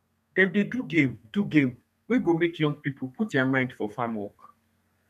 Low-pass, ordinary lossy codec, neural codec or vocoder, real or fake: 14.4 kHz; none; codec, 32 kHz, 1.9 kbps, SNAC; fake